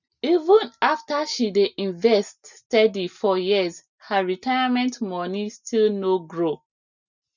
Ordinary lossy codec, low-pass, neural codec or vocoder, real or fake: none; 7.2 kHz; none; real